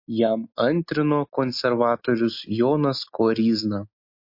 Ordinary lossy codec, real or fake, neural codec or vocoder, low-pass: MP3, 32 kbps; real; none; 5.4 kHz